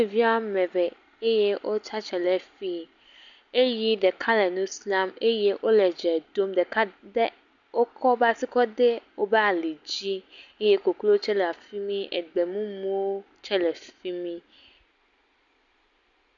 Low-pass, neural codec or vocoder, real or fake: 7.2 kHz; none; real